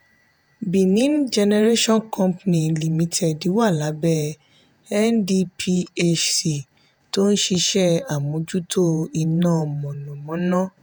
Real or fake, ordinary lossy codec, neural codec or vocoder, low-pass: fake; none; vocoder, 48 kHz, 128 mel bands, Vocos; none